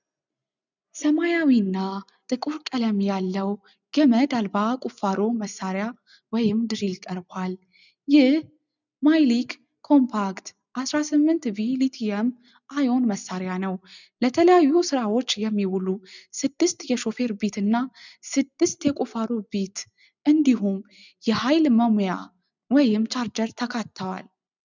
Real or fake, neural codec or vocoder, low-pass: real; none; 7.2 kHz